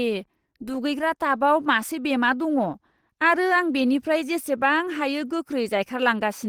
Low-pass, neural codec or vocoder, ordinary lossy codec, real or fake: 19.8 kHz; none; Opus, 16 kbps; real